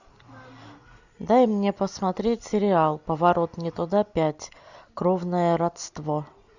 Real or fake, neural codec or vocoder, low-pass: real; none; 7.2 kHz